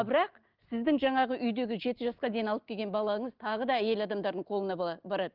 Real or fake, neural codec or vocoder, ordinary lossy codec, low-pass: real; none; Opus, 32 kbps; 5.4 kHz